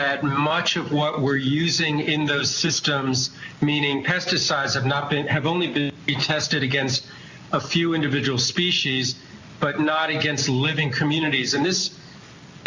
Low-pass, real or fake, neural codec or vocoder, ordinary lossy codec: 7.2 kHz; real; none; Opus, 64 kbps